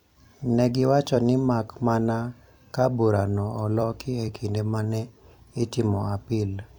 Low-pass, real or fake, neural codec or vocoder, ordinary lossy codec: 19.8 kHz; fake; vocoder, 44.1 kHz, 128 mel bands every 256 samples, BigVGAN v2; none